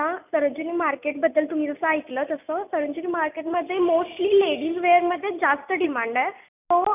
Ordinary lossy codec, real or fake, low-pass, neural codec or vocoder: none; real; 3.6 kHz; none